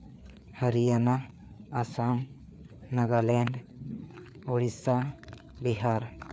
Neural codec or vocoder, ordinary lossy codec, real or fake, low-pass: codec, 16 kHz, 4 kbps, FreqCodec, larger model; none; fake; none